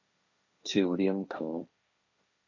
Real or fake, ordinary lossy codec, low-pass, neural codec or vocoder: fake; MP3, 64 kbps; 7.2 kHz; codec, 16 kHz, 1.1 kbps, Voila-Tokenizer